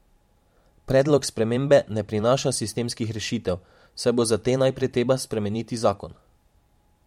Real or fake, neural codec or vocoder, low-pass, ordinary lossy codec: fake; vocoder, 44.1 kHz, 128 mel bands every 512 samples, BigVGAN v2; 19.8 kHz; MP3, 64 kbps